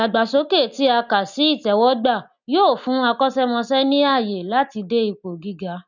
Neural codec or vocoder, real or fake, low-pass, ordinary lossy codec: none; real; 7.2 kHz; none